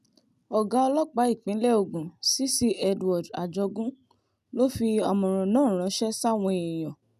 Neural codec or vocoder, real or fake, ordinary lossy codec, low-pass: none; real; none; 14.4 kHz